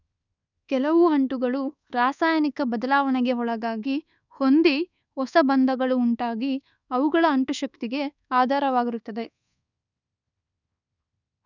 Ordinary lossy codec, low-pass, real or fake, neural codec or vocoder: none; 7.2 kHz; fake; codec, 24 kHz, 1.2 kbps, DualCodec